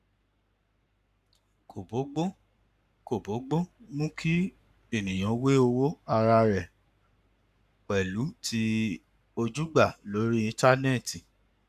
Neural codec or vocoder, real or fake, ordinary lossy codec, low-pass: codec, 44.1 kHz, 7.8 kbps, Pupu-Codec; fake; none; 14.4 kHz